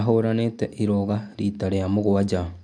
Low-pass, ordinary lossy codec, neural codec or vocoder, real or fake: 9.9 kHz; MP3, 64 kbps; none; real